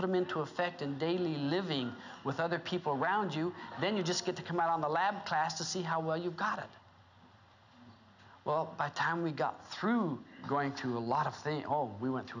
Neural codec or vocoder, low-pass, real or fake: none; 7.2 kHz; real